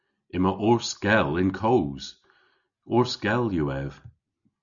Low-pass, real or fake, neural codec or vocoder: 7.2 kHz; real; none